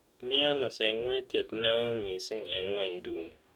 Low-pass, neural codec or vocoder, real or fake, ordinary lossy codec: 19.8 kHz; codec, 44.1 kHz, 2.6 kbps, DAC; fake; none